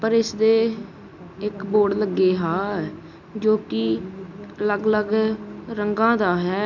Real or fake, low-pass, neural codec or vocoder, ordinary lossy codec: real; 7.2 kHz; none; none